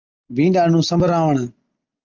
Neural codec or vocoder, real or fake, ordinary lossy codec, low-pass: none; real; Opus, 24 kbps; 7.2 kHz